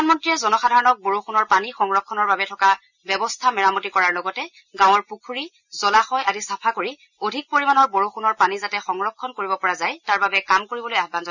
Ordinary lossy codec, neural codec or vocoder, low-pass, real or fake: none; none; 7.2 kHz; real